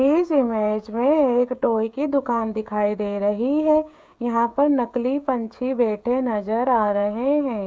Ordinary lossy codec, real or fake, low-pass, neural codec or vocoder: none; fake; none; codec, 16 kHz, 16 kbps, FreqCodec, smaller model